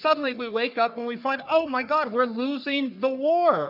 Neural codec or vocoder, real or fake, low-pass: codec, 44.1 kHz, 3.4 kbps, Pupu-Codec; fake; 5.4 kHz